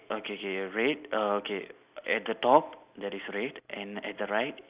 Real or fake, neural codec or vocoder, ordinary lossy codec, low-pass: real; none; Opus, 64 kbps; 3.6 kHz